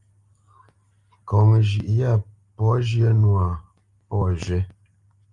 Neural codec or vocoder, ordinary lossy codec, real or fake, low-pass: none; Opus, 32 kbps; real; 10.8 kHz